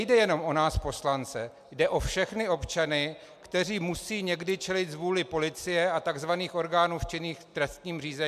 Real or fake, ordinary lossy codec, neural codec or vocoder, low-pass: real; MP3, 96 kbps; none; 14.4 kHz